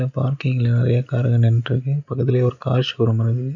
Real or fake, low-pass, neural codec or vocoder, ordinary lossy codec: real; 7.2 kHz; none; none